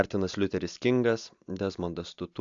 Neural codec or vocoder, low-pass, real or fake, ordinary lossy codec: none; 7.2 kHz; real; AAC, 64 kbps